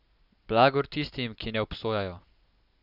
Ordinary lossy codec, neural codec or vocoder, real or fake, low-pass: none; none; real; 5.4 kHz